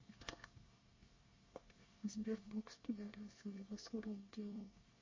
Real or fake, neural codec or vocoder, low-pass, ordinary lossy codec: fake; codec, 24 kHz, 1 kbps, SNAC; 7.2 kHz; MP3, 32 kbps